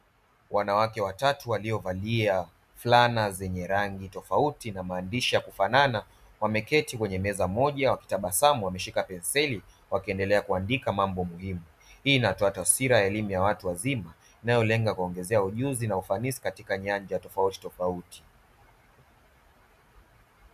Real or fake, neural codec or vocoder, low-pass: real; none; 14.4 kHz